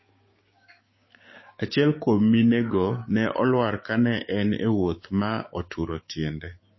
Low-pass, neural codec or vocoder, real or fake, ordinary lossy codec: 7.2 kHz; codec, 16 kHz, 6 kbps, DAC; fake; MP3, 24 kbps